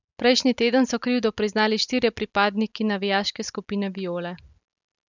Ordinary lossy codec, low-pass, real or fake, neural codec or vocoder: none; 7.2 kHz; real; none